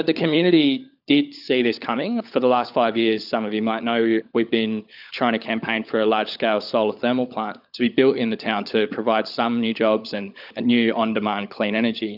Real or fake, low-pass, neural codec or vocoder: fake; 5.4 kHz; codec, 16 kHz, 16 kbps, FunCodec, trained on LibriTTS, 50 frames a second